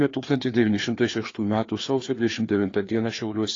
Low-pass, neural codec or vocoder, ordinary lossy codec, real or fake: 7.2 kHz; codec, 16 kHz, 2 kbps, FreqCodec, larger model; AAC, 32 kbps; fake